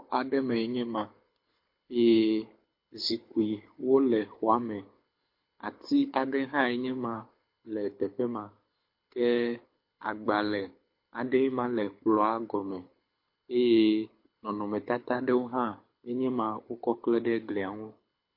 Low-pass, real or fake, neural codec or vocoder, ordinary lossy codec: 5.4 kHz; fake; codec, 24 kHz, 6 kbps, HILCodec; MP3, 32 kbps